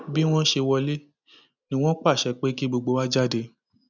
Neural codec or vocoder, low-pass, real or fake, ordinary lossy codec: none; 7.2 kHz; real; none